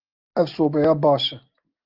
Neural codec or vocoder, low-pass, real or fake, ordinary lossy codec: none; 5.4 kHz; real; Opus, 32 kbps